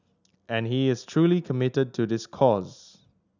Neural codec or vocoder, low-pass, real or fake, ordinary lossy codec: none; 7.2 kHz; real; none